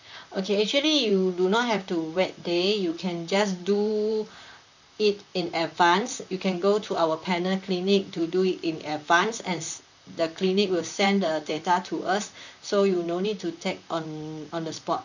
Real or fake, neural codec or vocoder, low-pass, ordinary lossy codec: fake; vocoder, 44.1 kHz, 128 mel bands, Pupu-Vocoder; 7.2 kHz; none